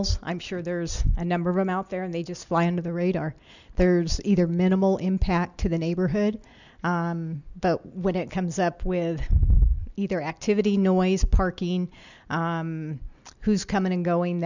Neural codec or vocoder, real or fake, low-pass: none; real; 7.2 kHz